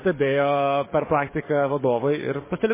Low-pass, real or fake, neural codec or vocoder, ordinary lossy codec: 3.6 kHz; fake; codec, 44.1 kHz, 7.8 kbps, DAC; MP3, 16 kbps